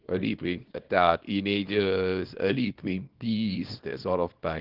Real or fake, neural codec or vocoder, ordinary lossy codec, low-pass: fake; codec, 24 kHz, 0.9 kbps, WavTokenizer, small release; Opus, 16 kbps; 5.4 kHz